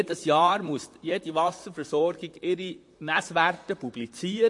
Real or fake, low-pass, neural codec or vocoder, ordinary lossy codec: fake; 10.8 kHz; vocoder, 44.1 kHz, 128 mel bands, Pupu-Vocoder; MP3, 48 kbps